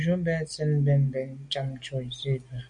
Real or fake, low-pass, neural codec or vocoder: real; 9.9 kHz; none